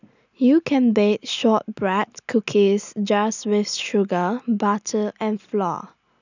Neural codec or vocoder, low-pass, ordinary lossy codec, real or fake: none; 7.2 kHz; none; real